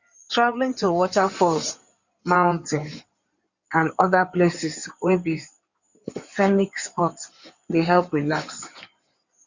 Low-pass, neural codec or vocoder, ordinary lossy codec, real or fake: 7.2 kHz; codec, 16 kHz in and 24 kHz out, 2.2 kbps, FireRedTTS-2 codec; Opus, 64 kbps; fake